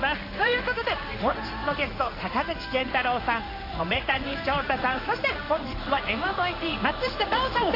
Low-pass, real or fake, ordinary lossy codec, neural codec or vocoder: 5.4 kHz; fake; AAC, 32 kbps; codec, 16 kHz in and 24 kHz out, 1 kbps, XY-Tokenizer